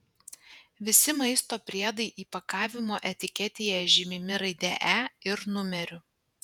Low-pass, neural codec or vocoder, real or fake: 19.8 kHz; vocoder, 48 kHz, 128 mel bands, Vocos; fake